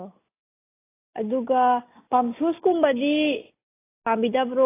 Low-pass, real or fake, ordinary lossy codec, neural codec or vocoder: 3.6 kHz; real; AAC, 16 kbps; none